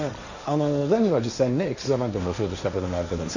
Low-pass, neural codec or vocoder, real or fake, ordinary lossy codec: 7.2 kHz; codec, 16 kHz, 1.1 kbps, Voila-Tokenizer; fake; none